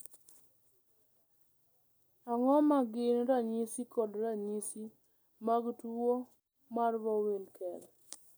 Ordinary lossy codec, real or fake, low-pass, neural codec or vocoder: none; real; none; none